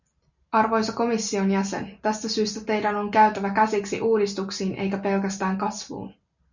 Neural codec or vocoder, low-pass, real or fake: none; 7.2 kHz; real